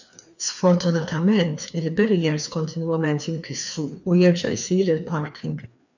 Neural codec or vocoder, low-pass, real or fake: codec, 16 kHz, 2 kbps, FreqCodec, larger model; 7.2 kHz; fake